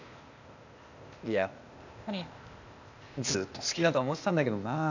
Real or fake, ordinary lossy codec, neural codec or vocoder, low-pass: fake; none; codec, 16 kHz, 0.8 kbps, ZipCodec; 7.2 kHz